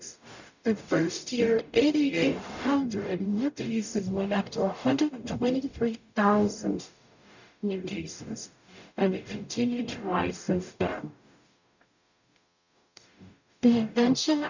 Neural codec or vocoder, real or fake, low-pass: codec, 44.1 kHz, 0.9 kbps, DAC; fake; 7.2 kHz